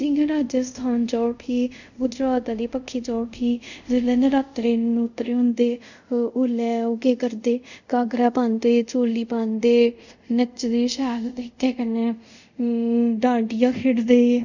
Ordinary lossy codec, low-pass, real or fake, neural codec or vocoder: none; 7.2 kHz; fake; codec, 24 kHz, 0.5 kbps, DualCodec